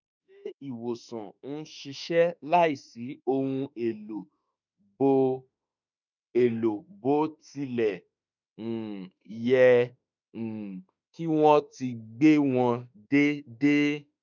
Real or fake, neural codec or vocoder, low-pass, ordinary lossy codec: fake; autoencoder, 48 kHz, 32 numbers a frame, DAC-VAE, trained on Japanese speech; 7.2 kHz; none